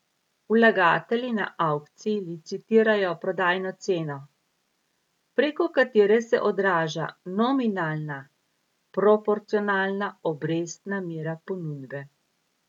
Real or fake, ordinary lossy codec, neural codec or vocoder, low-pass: real; none; none; 19.8 kHz